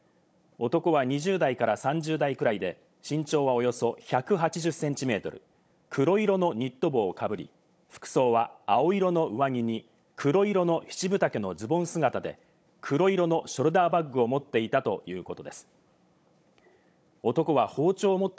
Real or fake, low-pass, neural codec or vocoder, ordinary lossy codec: fake; none; codec, 16 kHz, 16 kbps, FunCodec, trained on Chinese and English, 50 frames a second; none